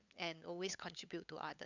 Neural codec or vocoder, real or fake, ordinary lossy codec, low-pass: codec, 16 kHz, 8 kbps, FunCodec, trained on LibriTTS, 25 frames a second; fake; none; 7.2 kHz